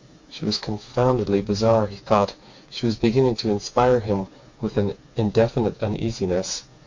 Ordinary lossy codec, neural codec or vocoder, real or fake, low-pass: MP3, 48 kbps; codec, 16 kHz, 4 kbps, FreqCodec, smaller model; fake; 7.2 kHz